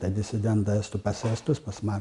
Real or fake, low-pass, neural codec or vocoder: real; 10.8 kHz; none